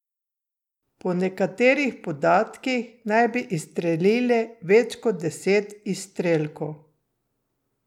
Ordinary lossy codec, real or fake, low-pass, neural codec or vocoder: none; real; 19.8 kHz; none